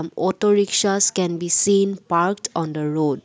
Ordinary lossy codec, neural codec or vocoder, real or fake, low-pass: none; none; real; none